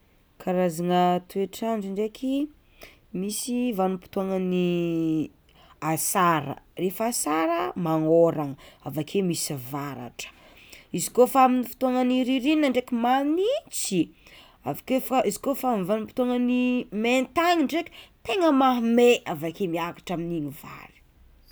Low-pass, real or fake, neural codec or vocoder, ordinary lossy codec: none; real; none; none